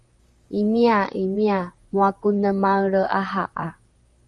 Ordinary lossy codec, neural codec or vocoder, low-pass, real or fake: Opus, 32 kbps; vocoder, 44.1 kHz, 128 mel bands, Pupu-Vocoder; 10.8 kHz; fake